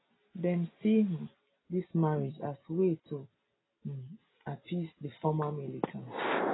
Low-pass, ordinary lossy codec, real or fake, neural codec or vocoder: 7.2 kHz; AAC, 16 kbps; real; none